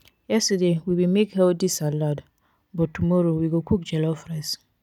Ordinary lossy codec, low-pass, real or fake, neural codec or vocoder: none; 19.8 kHz; real; none